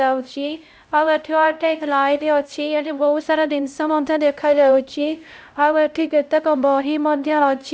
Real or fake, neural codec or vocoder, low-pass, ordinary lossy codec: fake; codec, 16 kHz, 0.5 kbps, X-Codec, HuBERT features, trained on LibriSpeech; none; none